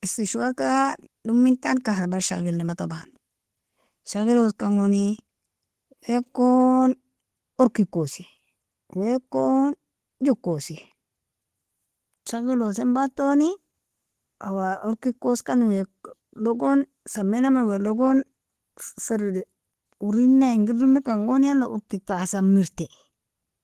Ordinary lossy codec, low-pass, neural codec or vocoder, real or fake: Opus, 16 kbps; 14.4 kHz; none; real